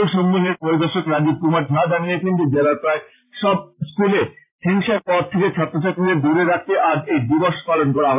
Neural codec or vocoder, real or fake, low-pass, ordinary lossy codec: vocoder, 44.1 kHz, 128 mel bands every 512 samples, BigVGAN v2; fake; 3.6 kHz; AAC, 32 kbps